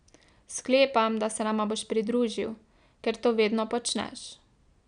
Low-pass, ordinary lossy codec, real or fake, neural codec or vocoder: 9.9 kHz; none; real; none